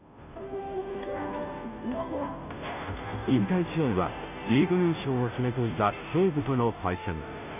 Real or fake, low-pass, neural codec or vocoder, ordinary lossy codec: fake; 3.6 kHz; codec, 16 kHz, 0.5 kbps, FunCodec, trained on Chinese and English, 25 frames a second; none